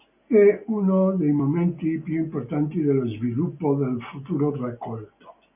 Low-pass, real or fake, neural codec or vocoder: 3.6 kHz; real; none